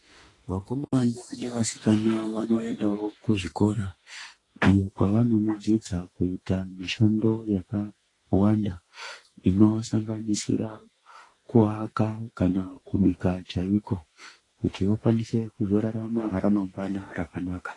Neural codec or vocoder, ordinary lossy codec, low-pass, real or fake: autoencoder, 48 kHz, 32 numbers a frame, DAC-VAE, trained on Japanese speech; AAC, 32 kbps; 10.8 kHz; fake